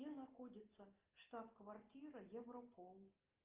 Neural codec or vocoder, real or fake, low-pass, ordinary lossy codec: none; real; 3.6 kHz; Opus, 32 kbps